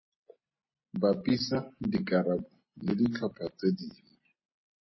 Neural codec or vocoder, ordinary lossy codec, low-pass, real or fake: none; MP3, 24 kbps; 7.2 kHz; real